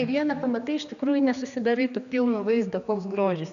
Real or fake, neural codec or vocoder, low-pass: fake; codec, 16 kHz, 2 kbps, X-Codec, HuBERT features, trained on general audio; 7.2 kHz